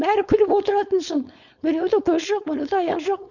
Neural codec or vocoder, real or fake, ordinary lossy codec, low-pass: codec, 16 kHz, 4.8 kbps, FACodec; fake; none; 7.2 kHz